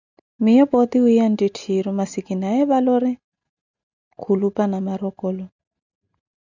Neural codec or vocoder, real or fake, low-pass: none; real; 7.2 kHz